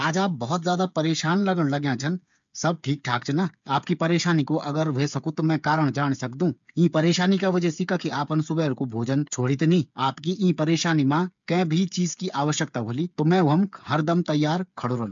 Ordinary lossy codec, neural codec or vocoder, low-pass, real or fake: MP3, 64 kbps; codec, 16 kHz, 8 kbps, FreqCodec, smaller model; 7.2 kHz; fake